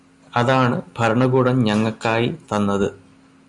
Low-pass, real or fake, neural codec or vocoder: 10.8 kHz; real; none